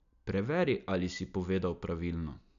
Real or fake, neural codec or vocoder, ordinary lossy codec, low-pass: real; none; none; 7.2 kHz